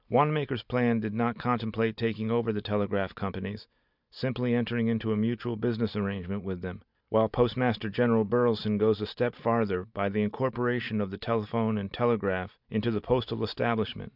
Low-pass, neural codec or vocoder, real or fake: 5.4 kHz; none; real